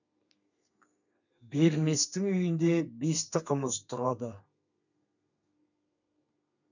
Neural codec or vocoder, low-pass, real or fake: codec, 32 kHz, 1.9 kbps, SNAC; 7.2 kHz; fake